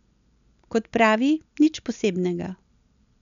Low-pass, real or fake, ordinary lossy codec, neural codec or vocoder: 7.2 kHz; real; none; none